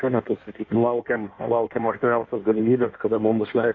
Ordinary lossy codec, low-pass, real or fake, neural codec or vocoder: AAC, 32 kbps; 7.2 kHz; fake; codec, 16 kHz in and 24 kHz out, 1.1 kbps, FireRedTTS-2 codec